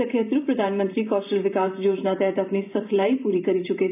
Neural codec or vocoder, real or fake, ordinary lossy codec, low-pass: vocoder, 44.1 kHz, 128 mel bands every 512 samples, BigVGAN v2; fake; none; 3.6 kHz